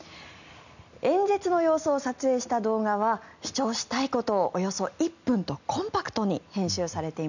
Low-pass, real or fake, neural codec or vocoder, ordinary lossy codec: 7.2 kHz; real; none; none